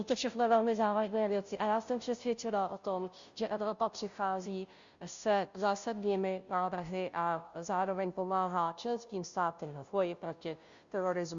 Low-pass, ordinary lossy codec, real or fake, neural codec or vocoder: 7.2 kHz; Opus, 64 kbps; fake; codec, 16 kHz, 0.5 kbps, FunCodec, trained on Chinese and English, 25 frames a second